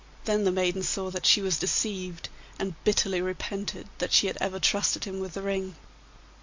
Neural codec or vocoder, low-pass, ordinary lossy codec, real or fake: none; 7.2 kHz; MP3, 48 kbps; real